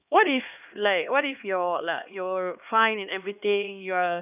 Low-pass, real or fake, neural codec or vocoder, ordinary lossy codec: 3.6 kHz; fake; codec, 16 kHz, 2 kbps, X-Codec, HuBERT features, trained on LibriSpeech; none